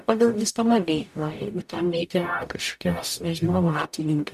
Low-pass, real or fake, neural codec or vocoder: 14.4 kHz; fake; codec, 44.1 kHz, 0.9 kbps, DAC